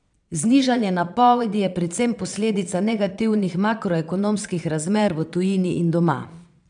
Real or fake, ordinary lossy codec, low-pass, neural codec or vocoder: fake; none; 9.9 kHz; vocoder, 22.05 kHz, 80 mel bands, Vocos